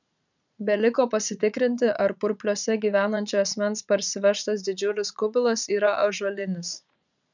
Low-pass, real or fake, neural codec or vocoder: 7.2 kHz; fake; vocoder, 44.1 kHz, 80 mel bands, Vocos